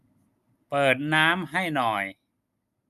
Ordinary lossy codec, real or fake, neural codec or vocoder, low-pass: none; real; none; 14.4 kHz